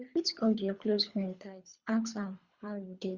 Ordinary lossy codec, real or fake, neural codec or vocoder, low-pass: Opus, 64 kbps; fake; codec, 24 kHz, 3 kbps, HILCodec; 7.2 kHz